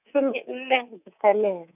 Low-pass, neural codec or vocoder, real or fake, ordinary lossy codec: 3.6 kHz; codec, 24 kHz, 3.1 kbps, DualCodec; fake; none